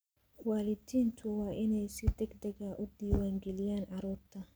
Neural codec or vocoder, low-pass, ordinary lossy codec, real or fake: none; none; none; real